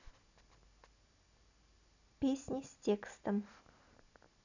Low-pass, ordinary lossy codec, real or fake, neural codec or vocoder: 7.2 kHz; none; real; none